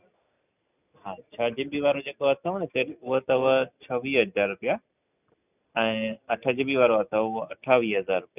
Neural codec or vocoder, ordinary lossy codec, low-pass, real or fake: none; none; 3.6 kHz; real